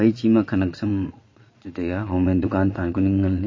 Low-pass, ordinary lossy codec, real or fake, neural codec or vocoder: 7.2 kHz; MP3, 32 kbps; fake; vocoder, 22.05 kHz, 80 mel bands, Vocos